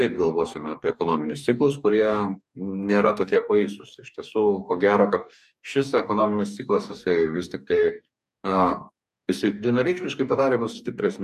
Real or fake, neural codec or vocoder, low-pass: fake; codec, 44.1 kHz, 2.6 kbps, DAC; 14.4 kHz